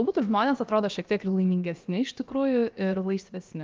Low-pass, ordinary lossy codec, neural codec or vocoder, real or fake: 7.2 kHz; Opus, 24 kbps; codec, 16 kHz, about 1 kbps, DyCAST, with the encoder's durations; fake